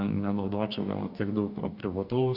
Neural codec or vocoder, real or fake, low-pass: codec, 44.1 kHz, 2.6 kbps, DAC; fake; 5.4 kHz